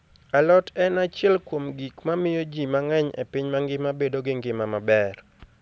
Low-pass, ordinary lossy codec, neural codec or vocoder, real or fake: none; none; none; real